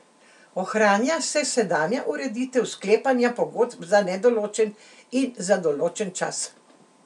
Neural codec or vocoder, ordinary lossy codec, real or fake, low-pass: none; none; real; 10.8 kHz